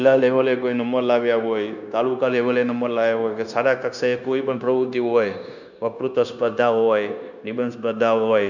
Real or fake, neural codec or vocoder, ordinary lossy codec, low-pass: fake; codec, 16 kHz, 0.9 kbps, LongCat-Audio-Codec; none; 7.2 kHz